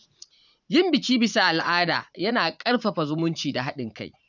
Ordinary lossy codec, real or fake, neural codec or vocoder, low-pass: none; fake; vocoder, 44.1 kHz, 80 mel bands, Vocos; 7.2 kHz